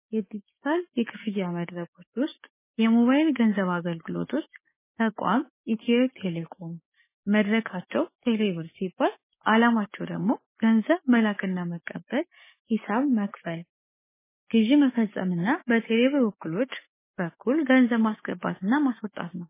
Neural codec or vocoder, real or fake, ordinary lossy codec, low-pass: codec, 16 kHz, 6 kbps, DAC; fake; MP3, 16 kbps; 3.6 kHz